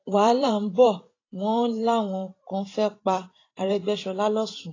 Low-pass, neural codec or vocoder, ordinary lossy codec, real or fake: 7.2 kHz; vocoder, 44.1 kHz, 128 mel bands every 512 samples, BigVGAN v2; AAC, 32 kbps; fake